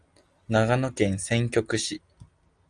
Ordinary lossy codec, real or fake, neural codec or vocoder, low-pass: Opus, 32 kbps; real; none; 9.9 kHz